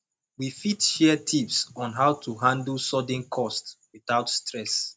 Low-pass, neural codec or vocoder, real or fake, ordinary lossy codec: none; none; real; none